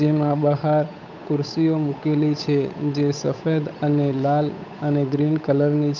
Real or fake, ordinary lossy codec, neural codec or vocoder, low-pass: fake; none; codec, 16 kHz, 8 kbps, FunCodec, trained on Chinese and English, 25 frames a second; 7.2 kHz